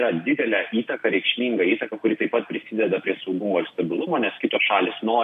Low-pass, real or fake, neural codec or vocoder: 14.4 kHz; real; none